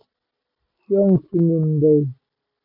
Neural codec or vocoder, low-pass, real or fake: none; 5.4 kHz; real